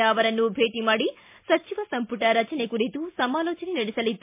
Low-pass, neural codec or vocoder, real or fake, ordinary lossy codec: 3.6 kHz; none; real; MP3, 24 kbps